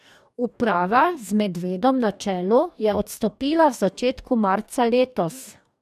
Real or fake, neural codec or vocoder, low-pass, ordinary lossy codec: fake; codec, 44.1 kHz, 2.6 kbps, DAC; 14.4 kHz; none